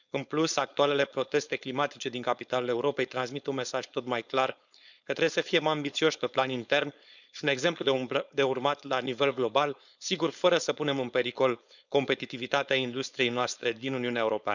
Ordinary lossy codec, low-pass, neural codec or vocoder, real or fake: none; 7.2 kHz; codec, 16 kHz, 4.8 kbps, FACodec; fake